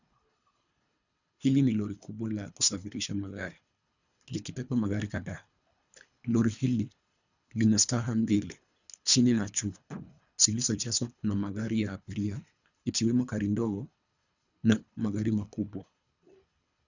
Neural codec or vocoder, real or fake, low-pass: codec, 24 kHz, 3 kbps, HILCodec; fake; 7.2 kHz